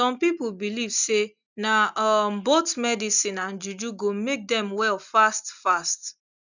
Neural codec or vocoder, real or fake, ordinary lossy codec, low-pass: none; real; none; 7.2 kHz